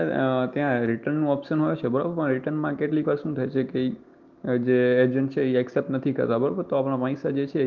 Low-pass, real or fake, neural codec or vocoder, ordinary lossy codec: 7.2 kHz; real; none; Opus, 32 kbps